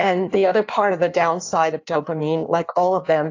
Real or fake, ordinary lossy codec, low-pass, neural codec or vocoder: fake; AAC, 48 kbps; 7.2 kHz; codec, 16 kHz in and 24 kHz out, 1.1 kbps, FireRedTTS-2 codec